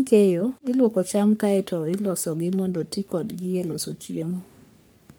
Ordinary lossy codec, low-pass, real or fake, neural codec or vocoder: none; none; fake; codec, 44.1 kHz, 3.4 kbps, Pupu-Codec